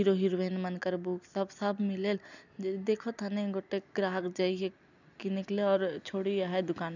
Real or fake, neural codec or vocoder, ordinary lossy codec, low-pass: real; none; none; 7.2 kHz